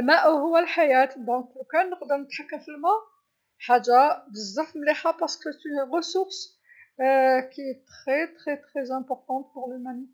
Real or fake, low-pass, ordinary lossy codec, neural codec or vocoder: real; none; none; none